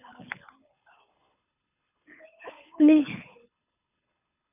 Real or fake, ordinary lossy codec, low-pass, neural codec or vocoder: fake; none; 3.6 kHz; codec, 24 kHz, 6 kbps, HILCodec